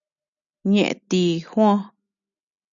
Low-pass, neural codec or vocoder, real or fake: 7.2 kHz; none; real